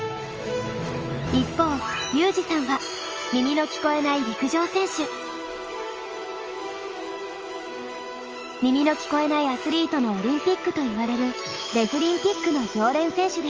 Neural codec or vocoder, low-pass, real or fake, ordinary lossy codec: autoencoder, 48 kHz, 128 numbers a frame, DAC-VAE, trained on Japanese speech; 7.2 kHz; fake; Opus, 24 kbps